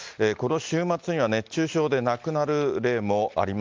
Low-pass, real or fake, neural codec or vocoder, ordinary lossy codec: 7.2 kHz; real; none; Opus, 32 kbps